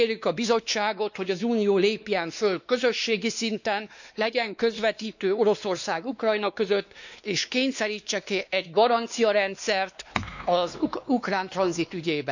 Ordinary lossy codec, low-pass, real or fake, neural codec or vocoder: none; 7.2 kHz; fake; codec, 16 kHz, 2 kbps, X-Codec, WavLM features, trained on Multilingual LibriSpeech